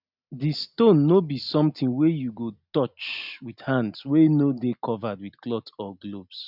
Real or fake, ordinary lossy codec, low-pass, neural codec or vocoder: real; none; 5.4 kHz; none